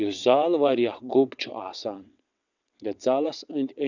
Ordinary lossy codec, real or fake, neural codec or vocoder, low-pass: none; fake; vocoder, 22.05 kHz, 80 mel bands, WaveNeXt; 7.2 kHz